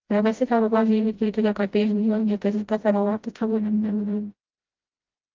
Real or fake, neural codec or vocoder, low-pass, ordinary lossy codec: fake; codec, 16 kHz, 0.5 kbps, FreqCodec, smaller model; 7.2 kHz; Opus, 32 kbps